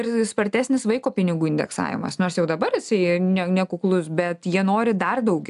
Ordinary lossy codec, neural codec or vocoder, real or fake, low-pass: AAC, 96 kbps; none; real; 10.8 kHz